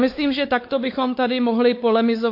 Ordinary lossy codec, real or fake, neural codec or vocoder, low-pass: MP3, 32 kbps; fake; codec, 16 kHz, 4 kbps, X-Codec, WavLM features, trained on Multilingual LibriSpeech; 5.4 kHz